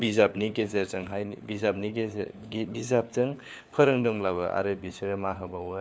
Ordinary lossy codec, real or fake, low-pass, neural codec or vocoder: none; fake; none; codec, 16 kHz, 4 kbps, FunCodec, trained on LibriTTS, 50 frames a second